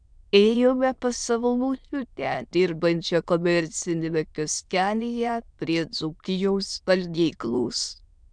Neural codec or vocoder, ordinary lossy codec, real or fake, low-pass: autoencoder, 22.05 kHz, a latent of 192 numbers a frame, VITS, trained on many speakers; MP3, 96 kbps; fake; 9.9 kHz